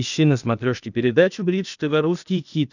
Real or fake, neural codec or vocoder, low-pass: fake; codec, 16 kHz, 0.8 kbps, ZipCodec; 7.2 kHz